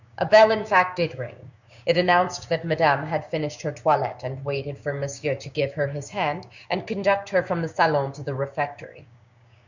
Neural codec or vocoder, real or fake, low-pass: codec, 16 kHz, 6 kbps, DAC; fake; 7.2 kHz